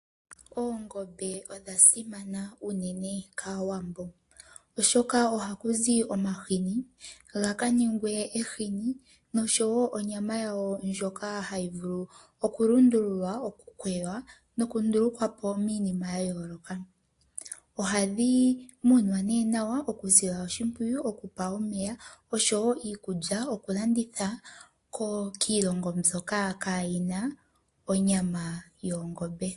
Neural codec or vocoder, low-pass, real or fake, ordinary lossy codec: none; 10.8 kHz; real; AAC, 48 kbps